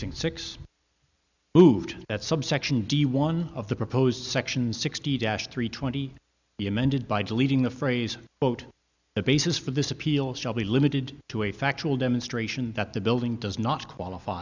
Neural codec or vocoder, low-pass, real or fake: none; 7.2 kHz; real